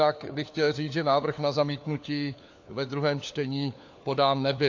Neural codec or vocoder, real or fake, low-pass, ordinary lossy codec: codec, 16 kHz, 4 kbps, FunCodec, trained on LibriTTS, 50 frames a second; fake; 7.2 kHz; AAC, 48 kbps